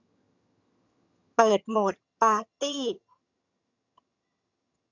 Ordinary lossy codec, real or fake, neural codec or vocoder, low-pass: none; fake; vocoder, 22.05 kHz, 80 mel bands, HiFi-GAN; 7.2 kHz